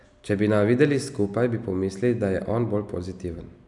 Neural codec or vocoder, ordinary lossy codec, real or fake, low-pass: none; none; real; 10.8 kHz